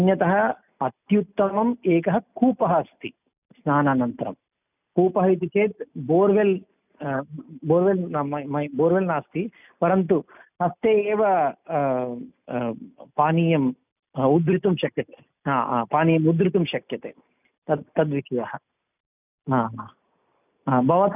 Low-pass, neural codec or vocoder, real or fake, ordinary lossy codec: 3.6 kHz; none; real; none